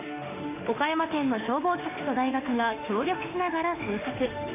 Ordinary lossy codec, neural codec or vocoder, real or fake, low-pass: none; autoencoder, 48 kHz, 32 numbers a frame, DAC-VAE, trained on Japanese speech; fake; 3.6 kHz